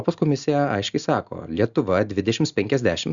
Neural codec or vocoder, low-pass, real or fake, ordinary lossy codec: none; 7.2 kHz; real; Opus, 64 kbps